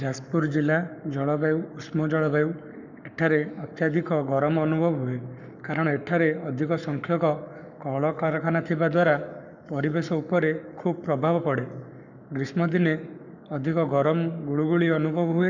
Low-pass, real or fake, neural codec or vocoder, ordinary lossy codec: 7.2 kHz; fake; codec, 44.1 kHz, 7.8 kbps, Pupu-Codec; none